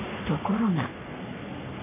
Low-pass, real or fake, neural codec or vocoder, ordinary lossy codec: 3.6 kHz; fake; codec, 44.1 kHz, 3.4 kbps, Pupu-Codec; MP3, 32 kbps